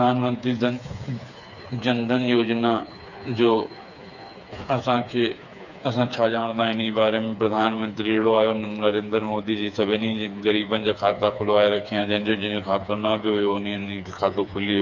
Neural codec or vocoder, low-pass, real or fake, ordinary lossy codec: codec, 16 kHz, 4 kbps, FreqCodec, smaller model; 7.2 kHz; fake; none